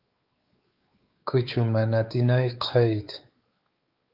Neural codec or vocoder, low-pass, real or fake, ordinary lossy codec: codec, 16 kHz, 4 kbps, X-Codec, WavLM features, trained on Multilingual LibriSpeech; 5.4 kHz; fake; Opus, 32 kbps